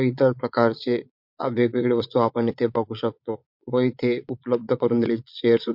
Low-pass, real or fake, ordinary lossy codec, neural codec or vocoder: 5.4 kHz; fake; MP3, 48 kbps; vocoder, 22.05 kHz, 80 mel bands, Vocos